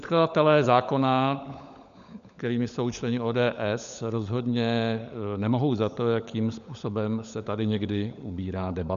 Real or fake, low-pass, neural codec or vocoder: fake; 7.2 kHz; codec, 16 kHz, 16 kbps, FunCodec, trained on LibriTTS, 50 frames a second